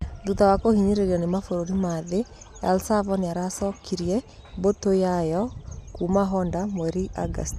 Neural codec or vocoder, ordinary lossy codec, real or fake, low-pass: none; none; real; 14.4 kHz